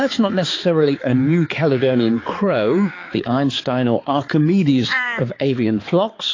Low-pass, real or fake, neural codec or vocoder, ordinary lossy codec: 7.2 kHz; fake; codec, 16 kHz, 4 kbps, X-Codec, HuBERT features, trained on balanced general audio; AAC, 32 kbps